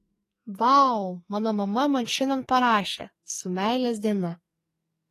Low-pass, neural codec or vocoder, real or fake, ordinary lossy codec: 14.4 kHz; codec, 44.1 kHz, 2.6 kbps, SNAC; fake; AAC, 48 kbps